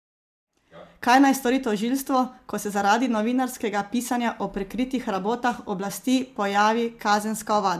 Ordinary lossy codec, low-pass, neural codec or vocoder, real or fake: MP3, 96 kbps; 14.4 kHz; none; real